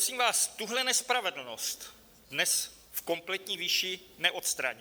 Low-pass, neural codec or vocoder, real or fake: 19.8 kHz; none; real